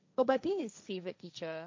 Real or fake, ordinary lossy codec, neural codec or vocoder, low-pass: fake; MP3, 64 kbps; codec, 16 kHz, 1.1 kbps, Voila-Tokenizer; 7.2 kHz